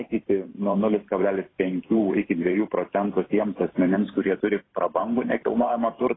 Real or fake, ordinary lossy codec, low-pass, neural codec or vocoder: fake; AAC, 16 kbps; 7.2 kHz; vocoder, 44.1 kHz, 128 mel bands every 512 samples, BigVGAN v2